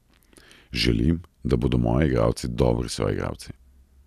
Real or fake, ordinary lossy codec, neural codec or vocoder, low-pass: real; none; none; 14.4 kHz